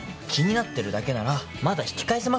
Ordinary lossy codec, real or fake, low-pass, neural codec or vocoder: none; real; none; none